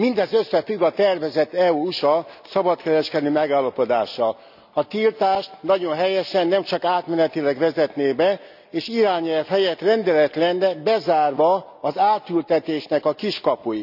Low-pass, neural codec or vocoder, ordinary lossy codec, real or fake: 5.4 kHz; none; none; real